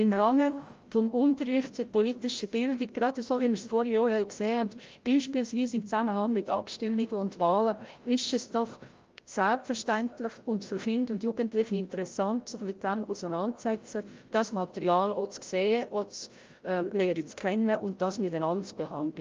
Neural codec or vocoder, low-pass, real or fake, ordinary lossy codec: codec, 16 kHz, 0.5 kbps, FreqCodec, larger model; 7.2 kHz; fake; Opus, 32 kbps